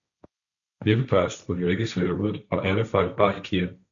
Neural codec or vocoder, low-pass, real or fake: codec, 16 kHz, 1.1 kbps, Voila-Tokenizer; 7.2 kHz; fake